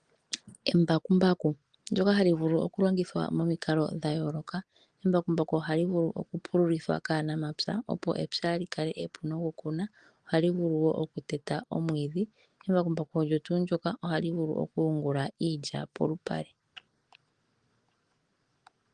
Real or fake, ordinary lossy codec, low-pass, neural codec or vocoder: real; Opus, 24 kbps; 9.9 kHz; none